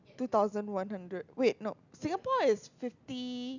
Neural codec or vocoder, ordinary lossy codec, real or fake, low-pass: none; none; real; 7.2 kHz